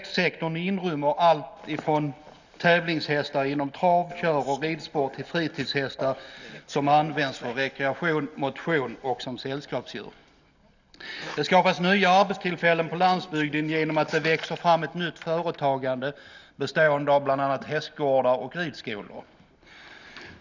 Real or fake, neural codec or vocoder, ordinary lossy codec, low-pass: real; none; none; 7.2 kHz